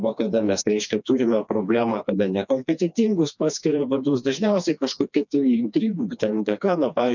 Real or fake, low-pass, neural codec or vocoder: fake; 7.2 kHz; codec, 16 kHz, 2 kbps, FreqCodec, smaller model